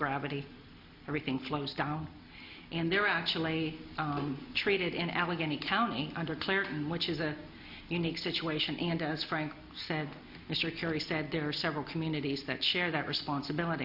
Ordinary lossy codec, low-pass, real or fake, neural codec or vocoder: MP3, 48 kbps; 5.4 kHz; real; none